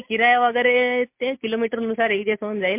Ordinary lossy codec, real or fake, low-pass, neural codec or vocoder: MP3, 32 kbps; real; 3.6 kHz; none